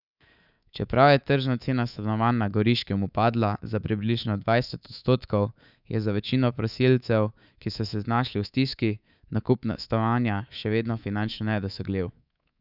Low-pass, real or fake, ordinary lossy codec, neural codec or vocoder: 5.4 kHz; fake; none; codec, 24 kHz, 3.1 kbps, DualCodec